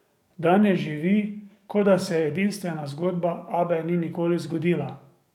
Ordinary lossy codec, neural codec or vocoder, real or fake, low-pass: none; codec, 44.1 kHz, 7.8 kbps, DAC; fake; 19.8 kHz